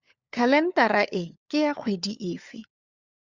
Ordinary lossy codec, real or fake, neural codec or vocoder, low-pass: Opus, 64 kbps; fake; codec, 16 kHz, 4 kbps, FunCodec, trained on LibriTTS, 50 frames a second; 7.2 kHz